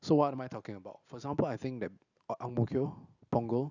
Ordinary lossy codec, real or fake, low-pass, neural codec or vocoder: none; real; 7.2 kHz; none